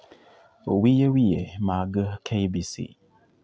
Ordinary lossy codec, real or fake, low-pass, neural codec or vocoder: none; real; none; none